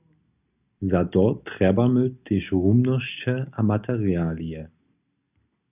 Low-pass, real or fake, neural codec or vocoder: 3.6 kHz; real; none